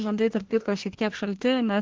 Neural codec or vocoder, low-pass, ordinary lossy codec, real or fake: codec, 24 kHz, 1 kbps, SNAC; 7.2 kHz; Opus, 16 kbps; fake